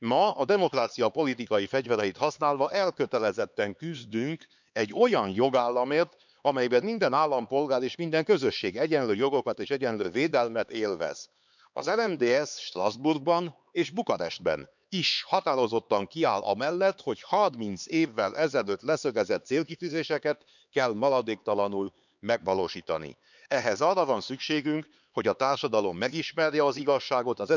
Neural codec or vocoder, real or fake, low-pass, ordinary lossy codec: codec, 16 kHz, 4 kbps, X-Codec, HuBERT features, trained on LibriSpeech; fake; 7.2 kHz; none